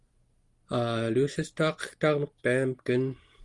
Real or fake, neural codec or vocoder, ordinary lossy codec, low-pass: real; none; Opus, 24 kbps; 10.8 kHz